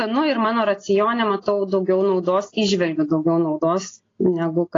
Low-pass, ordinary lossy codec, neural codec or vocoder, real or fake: 7.2 kHz; AAC, 32 kbps; none; real